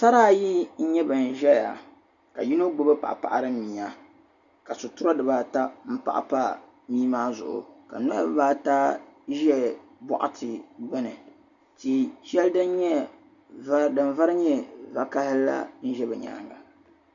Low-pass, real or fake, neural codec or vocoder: 7.2 kHz; real; none